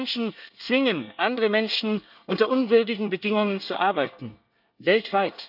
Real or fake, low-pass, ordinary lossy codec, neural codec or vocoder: fake; 5.4 kHz; none; codec, 24 kHz, 1 kbps, SNAC